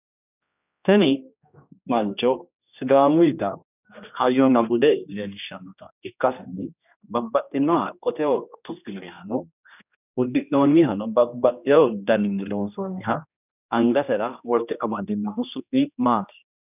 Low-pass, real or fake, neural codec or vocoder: 3.6 kHz; fake; codec, 16 kHz, 1 kbps, X-Codec, HuBERT features, trained on general audio